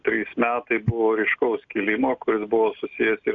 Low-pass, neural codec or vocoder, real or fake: 7.2 kHz; none; real